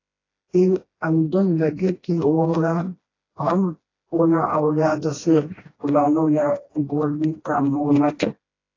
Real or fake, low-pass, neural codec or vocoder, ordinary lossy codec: fake; 7.2 kHz; codec, 16 kHz, 1 kbps, FreqCodec, smaller model; AAC, 32 kbps